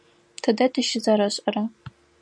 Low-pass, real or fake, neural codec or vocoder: 9.9 kHz; real; none